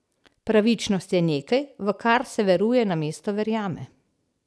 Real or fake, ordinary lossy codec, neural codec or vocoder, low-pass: real; none; none; none